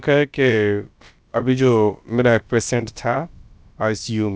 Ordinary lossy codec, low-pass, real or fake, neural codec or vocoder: none; none; fake; codec, 16 kHz, about 1 kbps, DyCAST, with the encoder's durations